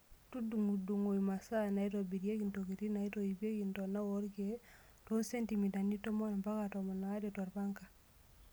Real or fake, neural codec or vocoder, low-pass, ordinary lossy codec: real; none; none; none